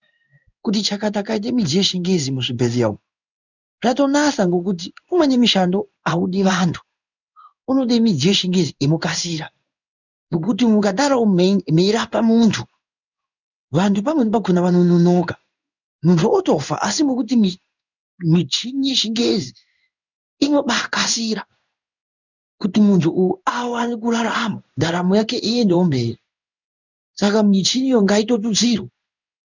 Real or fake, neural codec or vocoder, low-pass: fake; codec, 16 kHz in and 24 kHz out, 1 kbps, XY-Tokenizer; 7.2 kHz